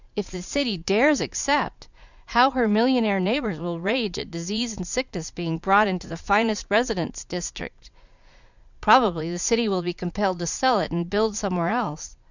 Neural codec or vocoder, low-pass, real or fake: vocoder, 44.1 kHz, 80 mel bands, Vocos; 7.2 kHz; fake